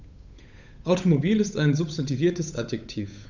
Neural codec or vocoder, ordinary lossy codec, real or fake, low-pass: codec, 16 kHz, 8 kbps, FunCodec, trained on Chinese and English, 25 frames a second; none; fake; 7.2 kHz